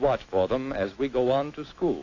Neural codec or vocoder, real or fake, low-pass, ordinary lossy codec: autoencoder, 48 kHz, 128 numbers a frame, DAC-VAE, trained on Japanese speech; fake; 7.2 kHz; AAC, 32 kbps